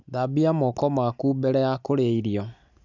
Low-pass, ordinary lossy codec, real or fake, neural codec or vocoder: 7.2 kHz; none; real; none